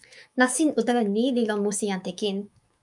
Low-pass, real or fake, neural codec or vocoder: 10.8 kHz; fake; autoencoder, 48 kHz, 128 numbers a frame, DAC-VAE, trained on Japanese speech